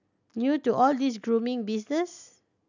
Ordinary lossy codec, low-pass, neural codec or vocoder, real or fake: none; 7.2 kHz; none; real